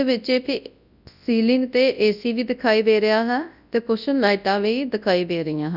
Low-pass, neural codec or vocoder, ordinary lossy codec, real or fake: 5.4 kHz; codec, 24 kHz, 0.9 kbps, WavTokenizer, large speech release; none; fake